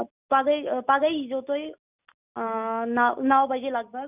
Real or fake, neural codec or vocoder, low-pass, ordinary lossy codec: real; none; 3.6 kHz; none